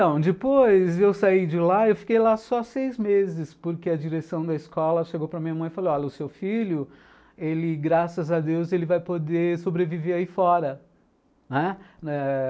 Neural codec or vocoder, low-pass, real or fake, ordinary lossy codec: none; none; real; none